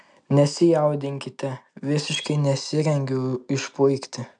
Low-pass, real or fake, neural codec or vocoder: 9.9 kHz; real; none